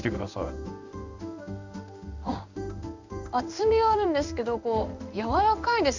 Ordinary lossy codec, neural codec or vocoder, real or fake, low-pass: none; codec, 16 kHz in and 24 kHz out, 1 kbps, XY-Tokenizer; fake; 7.2 kHz